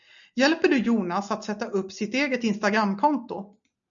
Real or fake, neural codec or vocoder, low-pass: real; none; 7.2 kHz